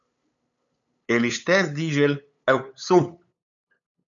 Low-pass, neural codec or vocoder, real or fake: 7.2 kHz; codec, 16 kHz, 8 kbps, FunCodec, trained on LibriTTS, 25 frames a second; fake